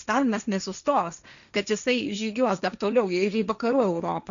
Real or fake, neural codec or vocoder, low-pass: fake; codec, 16 kHz, 1.1 kbps, Voila-Tokenizer; 7.2 kHz